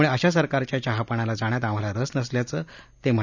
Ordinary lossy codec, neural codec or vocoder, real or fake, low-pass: none; none; real; 7.2 kHz